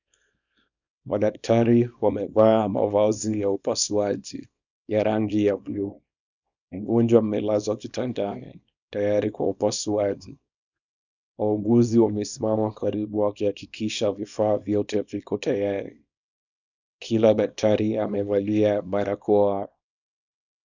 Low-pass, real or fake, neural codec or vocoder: 7.2 kHz; fake; codec, 24 kHz, 0.9 kbps, WavTokenizer, small release